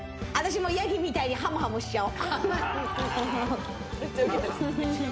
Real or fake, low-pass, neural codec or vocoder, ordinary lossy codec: real; none; none; none